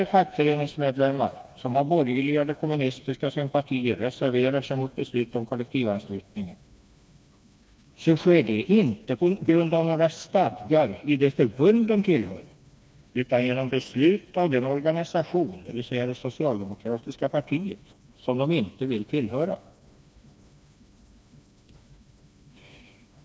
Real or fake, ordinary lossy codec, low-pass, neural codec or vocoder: fake; none; none; codec, 16 kHz, 2 kbps, FreqCodec, smaller model